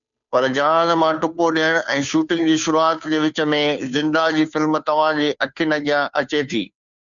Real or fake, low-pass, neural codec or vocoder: fake; 7.2 kHz; codec, 16 kHz, 2 kbps, FunCodec, trained on Chinese and English, 25 frames a second